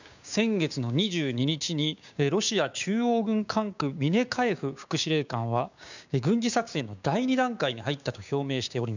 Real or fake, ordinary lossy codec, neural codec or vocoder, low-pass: fake; none; codec, 16 kHz, 6 kbps, DAC; 7.2 kHz